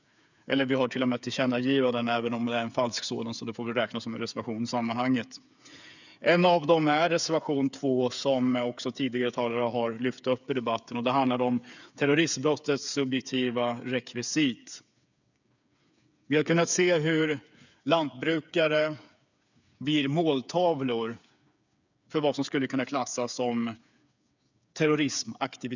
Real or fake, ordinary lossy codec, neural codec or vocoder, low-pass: fake; none; codec, 16 kHz, 8 kbps, FreqCodec, smaller model; 7.2 kHz